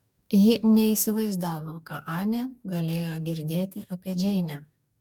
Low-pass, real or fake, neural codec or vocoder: 19.8 kHz; fake; codec, 44.1 kHz, 2.6 kbps, DAC